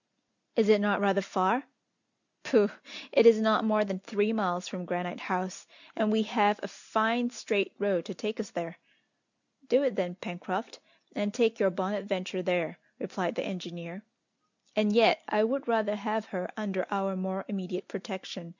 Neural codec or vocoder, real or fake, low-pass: none; real; 7.2 kHz